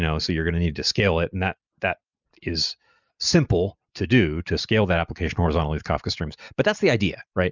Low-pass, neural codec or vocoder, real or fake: 7.2 kHz; none; real